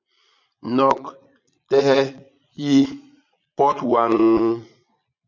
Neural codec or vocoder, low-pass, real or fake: vocoder, 22.05 kHz, 80 mel bands, Vocos; 7.2 kHz; fake